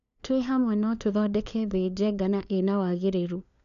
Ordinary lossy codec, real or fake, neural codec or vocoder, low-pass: none; fake; codec, 16 kHz, 4 kbps, FunCodec, trained on LibriTTS, 50 frames a second; 7.2 kHz